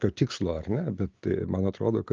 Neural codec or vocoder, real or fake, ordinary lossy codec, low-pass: none; real; Opus, 24 kbps; 7.2 kHz